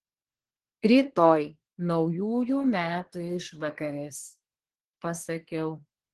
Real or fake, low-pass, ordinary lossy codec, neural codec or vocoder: fake; 10.8 kHz; Opus, 16 kbps; codec, 24 kHz, 1 kbps, SNAC